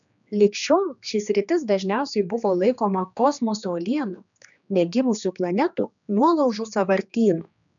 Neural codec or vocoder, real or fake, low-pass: codec, 16 kHz, 2 kbps, X-Codec, HuBERT features, trained on general audio; fake; 7.2 kHz